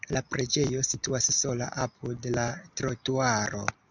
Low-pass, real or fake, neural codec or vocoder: 7.2 kHz; real; none